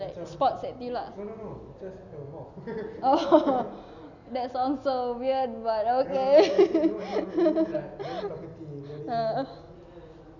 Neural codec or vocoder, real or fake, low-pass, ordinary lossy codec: none; real; 7.2 kHz; none